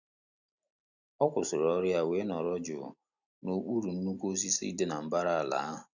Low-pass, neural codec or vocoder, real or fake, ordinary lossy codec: 7.2 kHz; none; real; none